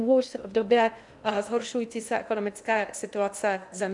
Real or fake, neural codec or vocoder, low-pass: fake; codec, 16 kHz in and 24 kHz out, 0.8 kbps, FocalCodec, streaming, 65536 codes; 10.8 kHz